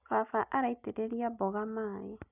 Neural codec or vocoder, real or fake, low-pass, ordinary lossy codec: none; real; 3.6 kHz; none